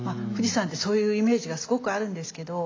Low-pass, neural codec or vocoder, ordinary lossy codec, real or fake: 7.2 kHz; none; AAC, 32 kbps; real